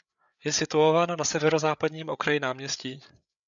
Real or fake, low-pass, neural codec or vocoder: fake; 7.2 kHz; codec, 16 kHz, 8 kbps, FreqCodec, larger model